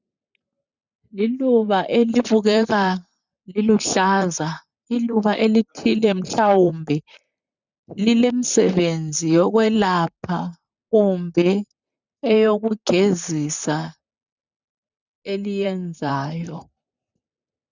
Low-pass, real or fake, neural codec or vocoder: 7.2 kHz; fake; vocoder, 44.1 kHz, 128 mel bands, Pupu-Vocoder